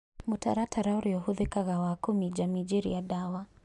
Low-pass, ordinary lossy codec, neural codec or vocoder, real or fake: 10.8 kHz; MP3, 96 kbps; none; real